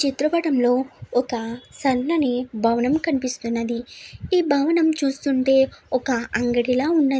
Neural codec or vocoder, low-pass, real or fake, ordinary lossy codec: none; none; real; none